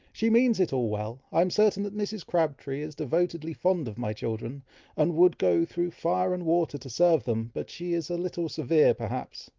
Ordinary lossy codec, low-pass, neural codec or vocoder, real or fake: Opus, 32 kbps; 7.2 kHz; none; real